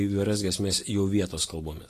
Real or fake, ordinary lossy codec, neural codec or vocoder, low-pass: real; AAC, 48 kbps; none; 14.4 kHz